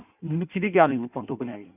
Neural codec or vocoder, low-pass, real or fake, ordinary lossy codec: codec, 16 kHz in and 24 kHz out, 1.1 kbps, FireRedTTS-2 codec; 3.6 kHz; fake; none